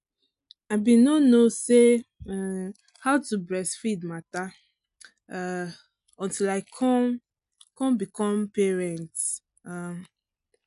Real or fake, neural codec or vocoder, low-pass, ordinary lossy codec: real; none; 10.8 kHz; none